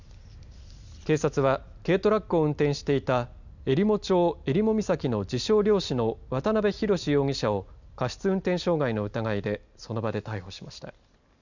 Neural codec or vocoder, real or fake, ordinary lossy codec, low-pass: none; real; none; 7.2 kHz